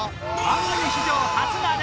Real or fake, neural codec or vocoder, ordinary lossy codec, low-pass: real; none; none; none